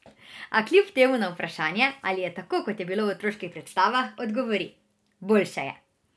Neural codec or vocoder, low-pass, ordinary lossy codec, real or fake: none; none; none; real